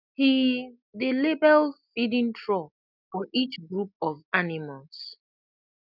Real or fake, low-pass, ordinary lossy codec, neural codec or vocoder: real; 5.4 kHz; none; none